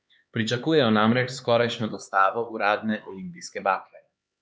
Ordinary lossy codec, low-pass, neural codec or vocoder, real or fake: none; none; codec, 16 kHz, 4 kbps, X-Codec, HuBERT features, trained on LibriSpeech; fake